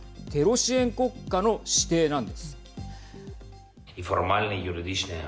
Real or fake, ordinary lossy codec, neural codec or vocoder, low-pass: real; none; none; none